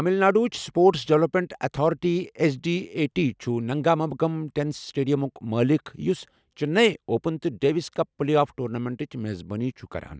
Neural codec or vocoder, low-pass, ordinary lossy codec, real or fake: none; none; none; real